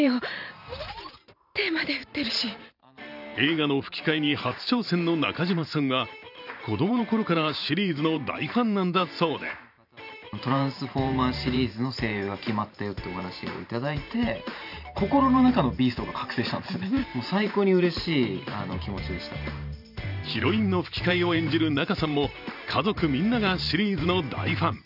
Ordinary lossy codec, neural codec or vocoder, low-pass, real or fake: none; vocoder, 44.1 kHz, 128 mel bands every 512 samples, BigVGAN v2; 5.4 kHz; fake